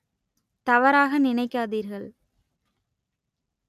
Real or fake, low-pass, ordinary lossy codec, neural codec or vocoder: real; 14.4 kHz; none; none